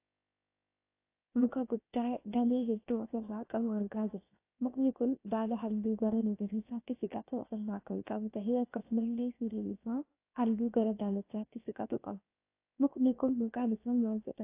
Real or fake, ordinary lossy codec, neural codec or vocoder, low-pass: fake; AAC, 24 kbps; codec, 16 kHz, 0.7 kbps, FocalCodec; 3.6 kHz